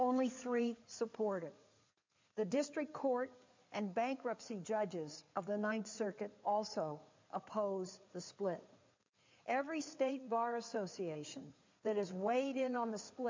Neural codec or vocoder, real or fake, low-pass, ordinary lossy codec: codec, 16 kHz in and 24 kHz out, 2.2 kbps, FireRedTTS-2 codec; fake; 7.2 kHz; MP3, 64 kbps